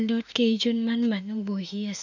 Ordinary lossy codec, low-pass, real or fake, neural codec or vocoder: none; 7.2 kHz; fake; autoencoder, 48 kHz, 32 numbers a frame, DAC-VAE, trained on Japanese speech